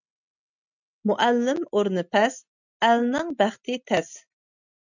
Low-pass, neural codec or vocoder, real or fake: 7.2 kHz; none; real